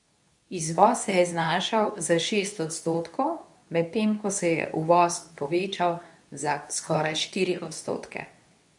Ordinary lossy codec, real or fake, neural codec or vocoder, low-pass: none; fake; codec, 24 kHz, 0.9 kbps, WavTokenizer, medium speech release version 2; 10.8 kHz